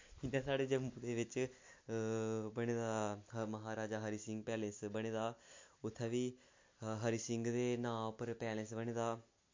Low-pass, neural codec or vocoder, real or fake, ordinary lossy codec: 7.2 kHz; none; real; MP3, 48 kbps